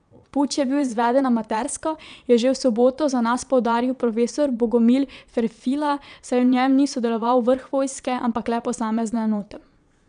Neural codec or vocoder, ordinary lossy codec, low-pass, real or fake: vocoder, 22.05 kHz, 80 mel bands, Vocos; none; 9.9 kHz; fake